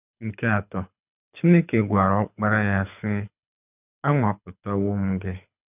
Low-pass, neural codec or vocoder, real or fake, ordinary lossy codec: 3.6 kHz; codec, 24 kHz, 6 kbps, HILCodec; fake; none